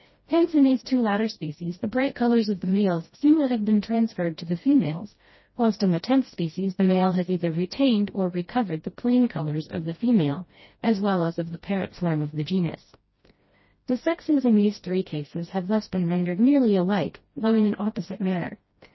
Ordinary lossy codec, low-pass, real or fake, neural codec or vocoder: MP3, 24 kbps; 7.2 kHz; fake; codec, 16 kHz, 1 kbps, FreqCodec, smaller model